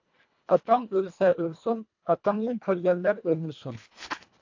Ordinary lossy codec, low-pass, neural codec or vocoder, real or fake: AAC, 48 kbps; 7.2 kHz; codec, 24 kHz, 1.5 kbps, HILCodec; fake